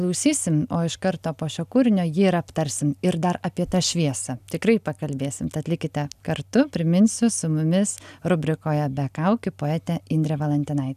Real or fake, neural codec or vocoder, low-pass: real; none; 14.4 kHz